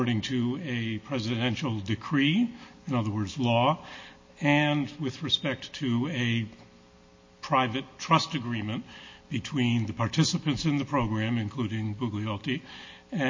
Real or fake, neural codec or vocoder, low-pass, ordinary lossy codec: real; none; 7.2 kHz; MP3, 32 kbps